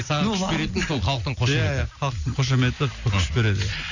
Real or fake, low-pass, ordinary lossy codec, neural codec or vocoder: real; 7.2 kHz; none; none